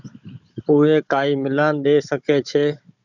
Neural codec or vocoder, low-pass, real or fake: codec, 16 kHz, 16 kbps, FunCodec, trained on Chinese and English, 50 frames a second; 7.2 kHz; fake